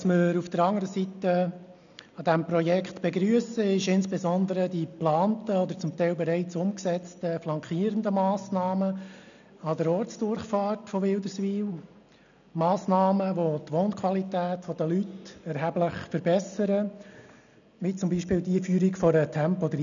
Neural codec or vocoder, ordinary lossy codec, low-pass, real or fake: none; none; 7.2 kHz; real